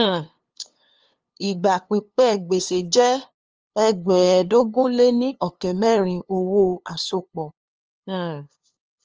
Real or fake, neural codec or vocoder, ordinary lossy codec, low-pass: fake; codec, 16 kHz, 2 kbps, FunCodec, trained on LibriTTS, 25 frames a second; Opus, 24 kbps; 7.2 kHz